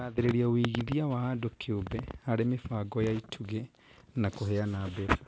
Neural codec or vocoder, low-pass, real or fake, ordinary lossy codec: none; none; real; none